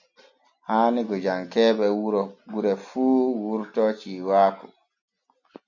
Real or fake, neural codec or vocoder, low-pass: real; none; 7.2 kHz